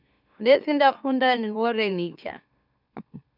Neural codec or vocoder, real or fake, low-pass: autoencoder, 44.1 kHz, a latent of 192 numbers a frame, MeloTTS; fake; 5.4 kHz